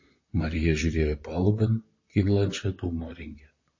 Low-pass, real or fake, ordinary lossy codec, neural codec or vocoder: 7.2 kHz; fake; MP3, 32 kbps; vocoder, 44.1 kHz, 128 mel bands, Pupu-Vocoder